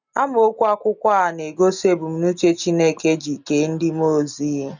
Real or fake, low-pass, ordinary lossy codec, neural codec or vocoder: real; 7.2 kHz; none; none